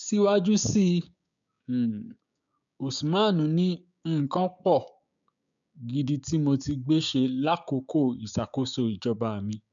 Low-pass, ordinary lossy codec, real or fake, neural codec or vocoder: 7.2 kHz; none; fake; codec, 16 kHz, 6 kbps, DAC